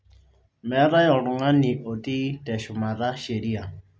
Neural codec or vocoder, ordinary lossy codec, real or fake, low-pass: none; none; real; none